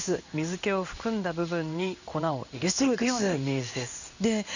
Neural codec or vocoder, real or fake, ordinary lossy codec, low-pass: codec, 16 kHz in and 24 kHz out, 1 kbps, XY-Tokenizer; fake; none; 7.2 kHz